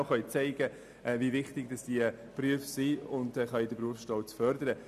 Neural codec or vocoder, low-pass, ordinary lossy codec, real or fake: none; 14.4 kHz; none; real